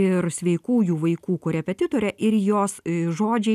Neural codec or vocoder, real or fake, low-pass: none; real; 14.4 kHz